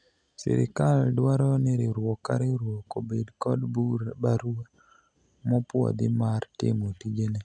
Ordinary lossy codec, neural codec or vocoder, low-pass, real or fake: none; none; 9.9 kHz; real